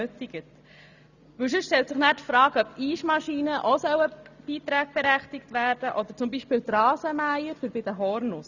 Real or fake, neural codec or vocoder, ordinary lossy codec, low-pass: real; none; none; 7.2 kHz